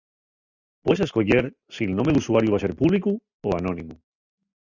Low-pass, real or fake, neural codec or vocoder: 7.2 kHz; real; none